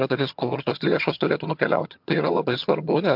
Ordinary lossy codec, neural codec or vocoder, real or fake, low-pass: MP3, 48 kbps; vocoder, 22.05 kHz, 80 mel bands, HiFi-GAN; fake; 5.4 kHz